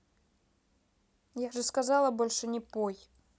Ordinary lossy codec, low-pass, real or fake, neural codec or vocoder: none; none; real; none